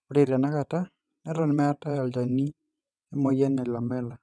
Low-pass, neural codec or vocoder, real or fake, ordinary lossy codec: none; vocoder, 22.05 kHz, 80 mel bands, WaveNeXt; fake; none